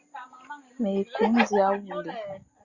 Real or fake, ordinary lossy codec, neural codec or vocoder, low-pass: real; Opus, 64 kbps; none; 7.2 kHz